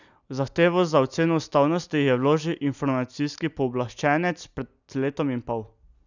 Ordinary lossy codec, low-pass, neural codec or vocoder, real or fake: none; 7.2 kHz; none; real